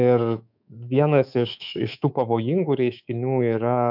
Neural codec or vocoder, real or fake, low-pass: codec, 24 kHz, 3.1 kbps, DualCodec; fake; 5.4 kHz